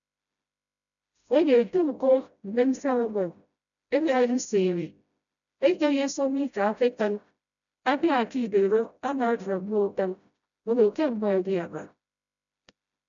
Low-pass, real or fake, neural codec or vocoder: 7.2 kHz; fake; codec, 16 kHz, 0.5 kbps, FreqCodec, smaller model